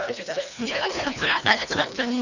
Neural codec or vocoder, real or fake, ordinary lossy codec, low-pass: codec, 24 kHz, 1.5 kbps, HILCodec; fake; none; 7.2 kHz